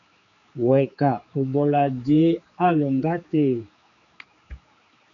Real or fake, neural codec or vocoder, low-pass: fake; codec, 16 kHz, 4 kbps, X-Codec, HuBERT features, trained on general audio; 7.2 kHz